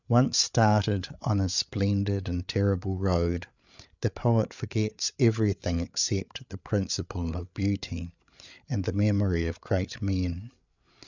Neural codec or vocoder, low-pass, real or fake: codec, 16 kHz, 8 kbps, FreqCodec, larger model; 7.2 kHz; fake